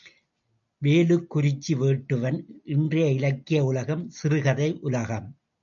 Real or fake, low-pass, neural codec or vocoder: real; 7.2 kHz; none